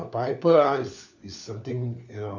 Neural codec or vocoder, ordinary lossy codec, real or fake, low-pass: codec, 16 kHz, 4 kbps, FunCodec, trained on LibriTTS, 50 frames a second; none; fake; 7.2 kHz